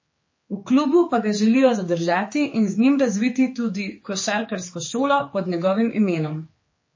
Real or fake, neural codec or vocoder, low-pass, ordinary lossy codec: fake; codec, 16 kHz, 4 kbps, X-Codec, HuBERT features, trained on general audio; 7.2 kHz; MP3, 32 kbps